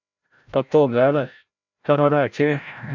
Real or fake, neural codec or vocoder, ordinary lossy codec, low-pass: fake; codec, 16 kHz, 0.5 kbps, FreqCodec, larger model; MP3, 64 kbps; 7.2 kHz